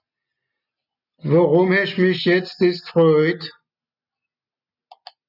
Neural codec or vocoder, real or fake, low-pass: none; real; 5.4 kHz